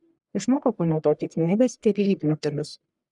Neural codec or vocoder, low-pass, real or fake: codec, 44.1 kHz, 1.7 kbps, Pupu-Codec; 10.8 kHz; fake